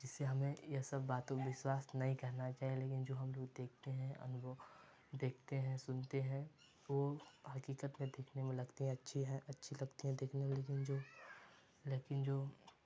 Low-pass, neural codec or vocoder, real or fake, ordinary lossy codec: none; none; real; none